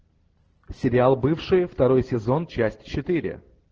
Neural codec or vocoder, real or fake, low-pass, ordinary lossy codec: none; real; 7.2 kHz; Opus, 16 kbps